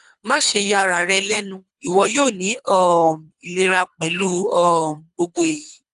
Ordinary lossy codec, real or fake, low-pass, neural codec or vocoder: none; fake; 10.8 kHz; codec, 24 kHz, 3 kbps, HILCodec